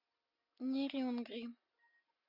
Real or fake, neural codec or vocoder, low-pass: real; none; 5.4 kHz